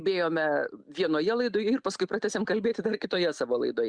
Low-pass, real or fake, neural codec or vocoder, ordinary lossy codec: 9.9 kHz; real; none; Opus, 64 kbps